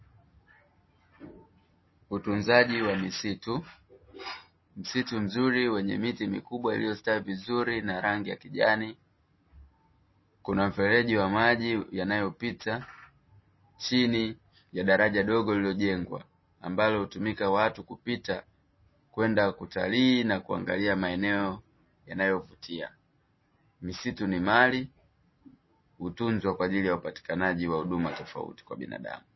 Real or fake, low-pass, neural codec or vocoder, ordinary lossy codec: real; 7.2 kHz; none; MP3, 24 kbps